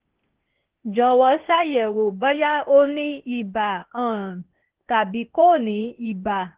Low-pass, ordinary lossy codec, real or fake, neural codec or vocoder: 3.6 kHz; Opus, 16 kbps; fake; codec, 16 kHz, 0.8 kbps, ZipCodec